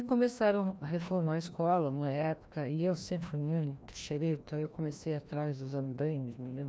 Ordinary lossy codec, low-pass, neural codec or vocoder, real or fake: none; none; codec, 16 kHz, 1 kbps, FreqCodec, larger model; fake